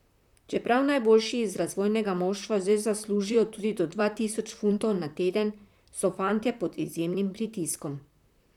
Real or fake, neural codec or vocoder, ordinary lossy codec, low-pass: fake; vocoder, 44.1 kHz, 128 mel bands, Pupu-Vocoder; none; 19.8 kHz